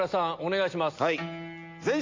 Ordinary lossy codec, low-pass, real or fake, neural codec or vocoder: MP3, 64 kbps; 7.2 kHz; real; none